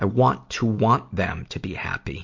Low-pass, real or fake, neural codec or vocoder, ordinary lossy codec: 7.2 kHz; real; none; MP3, 48 kbps